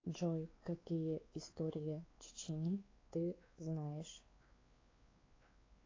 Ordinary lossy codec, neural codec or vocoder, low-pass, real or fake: AAC, 32 kbps; codec, 16 kHz, 4 kbps, X-Codec, WavLM features, trained on Multilingual LibriSpeech; 7.2 kHz; fake